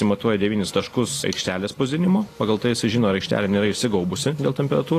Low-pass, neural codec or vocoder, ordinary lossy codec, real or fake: 14.4 kHz; none; AAC, 48 kbps; real